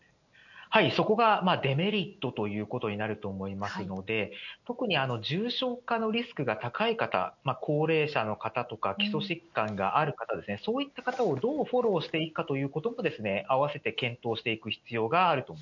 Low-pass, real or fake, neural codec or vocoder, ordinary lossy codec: 7.2 kHz; real; none; none